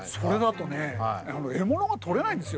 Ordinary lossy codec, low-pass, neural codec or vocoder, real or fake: none; none; none; real